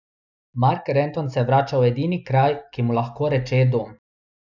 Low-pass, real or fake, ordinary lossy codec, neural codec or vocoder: 7.2 kHz; real; none; none